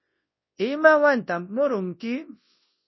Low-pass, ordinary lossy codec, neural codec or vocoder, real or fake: 7.2 kHz; MP3, 24 kbps; codec, 24 kHz, 0.9 kbps, WavTokenizer, large speech release; fake